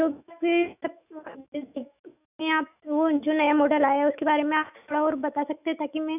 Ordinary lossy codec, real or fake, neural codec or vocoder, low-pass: none; fake; autoencoder, 48 kHz, 128 numbers a frame, DAC-VAE, trained on Japanese speech; 3.6 kHz